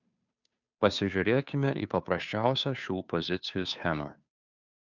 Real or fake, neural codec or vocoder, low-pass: fake; codec, 16 kHz, 2 kbps, FunCodec, trained on Chinese and English, 25 frames a second; 7.2 kHz